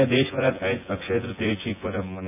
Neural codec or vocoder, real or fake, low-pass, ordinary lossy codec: vocoder, 24 kHz, 100 mel bands, Vocos; fake; 3.6 kHz; none